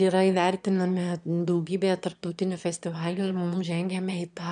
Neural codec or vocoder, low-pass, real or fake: autoencoder, 22.05 kHz, a latent of 192 numbers a frame, VITS, trained on one speaker; 9.9 kHz; fake